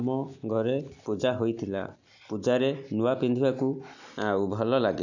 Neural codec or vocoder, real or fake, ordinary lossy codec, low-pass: none; real; none; 7.2 kHz